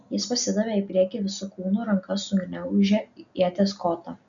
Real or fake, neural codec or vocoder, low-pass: real; none; 7.2 kHz